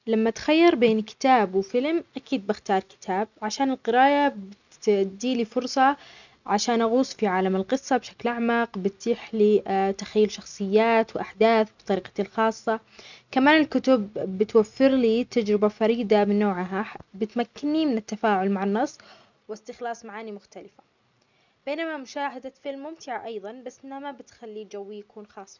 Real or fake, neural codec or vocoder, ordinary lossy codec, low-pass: real; none; none; 7.2 kHz